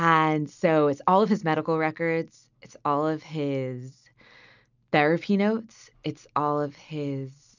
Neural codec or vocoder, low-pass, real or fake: none; 7.2 kHz; real